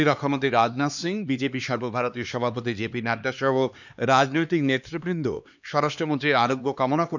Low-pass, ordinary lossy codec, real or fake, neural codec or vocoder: 7.2 kHz; none; fake; codec, 16 kHz, 2 kbps, X-Codec, HuBERT features, trained on LibriSpeech